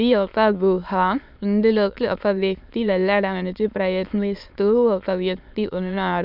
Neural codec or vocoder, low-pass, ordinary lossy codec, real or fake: autoencoder, 22.05 kHz, a latent of 192 numbers a frame, VITS, trained on many speakers; 5.4 kHz; none; fake